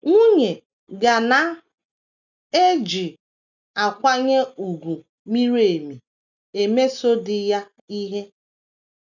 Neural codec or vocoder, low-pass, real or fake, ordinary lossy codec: none; 7.2 kHz; real; none